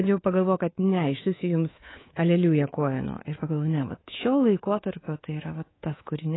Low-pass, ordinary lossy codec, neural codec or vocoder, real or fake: 7.2 kHz; AAC, 16 kbps; codec, 24 kHz, 3.1 kbps, DualCodec; fake